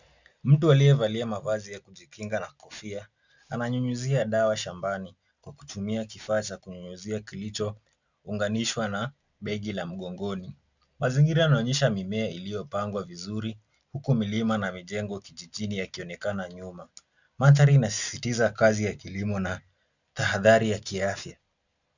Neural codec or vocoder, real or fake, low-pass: none; real; 7.2 kHz